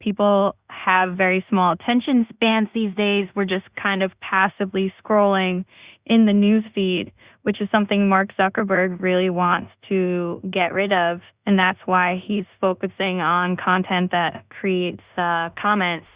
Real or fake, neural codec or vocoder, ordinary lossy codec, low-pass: fake; codec, 16 kHz in and 24 kHz out, 0.4 kbps, LongCat-Audio-Codec, two codebook decoder; Opus, 64 kbps; 3.6 kHz